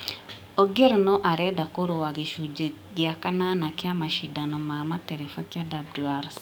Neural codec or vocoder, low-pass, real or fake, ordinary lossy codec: codec, 44.1 kHz, 7.8 kbps, DAC; none; fake; none